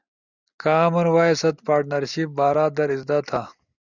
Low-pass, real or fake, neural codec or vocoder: 7.2 kHz; real; none